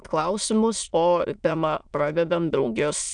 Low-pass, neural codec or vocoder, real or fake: 9.9 kHz; autoencoder, 22.05 kHz, a latent of 192 numbers a frame, VITS, trained on many speakers; fake